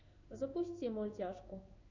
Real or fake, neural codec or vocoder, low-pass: fake; codec, 16 kHz in and 24 kHz out, 1 kbps, XY-Tokenizer; 7.2 kHz